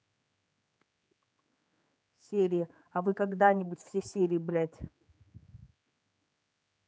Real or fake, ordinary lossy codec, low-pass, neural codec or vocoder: fake; none; none; codec, 16 kHz, 4 kbps, X-Codec, HuBERT features, trained on general audio